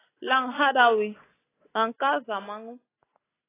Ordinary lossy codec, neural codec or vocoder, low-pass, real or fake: AAC, 16 kbps; none; 3.6 kHz; real